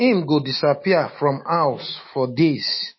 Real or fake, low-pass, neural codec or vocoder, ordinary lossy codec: fake; 7.2 kHz; autoencoder, 48 kHz, 128 numbers a frame, DAC-VAE, trained on Japanese speech; MP3, 24 kbps